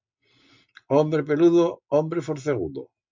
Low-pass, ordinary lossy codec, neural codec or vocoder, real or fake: 7.2 kHz; MP3, 64 kbps; none; real